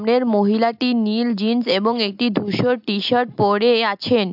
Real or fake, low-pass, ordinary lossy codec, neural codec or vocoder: real; 5.4 kHz; AAC, 48 kbps; none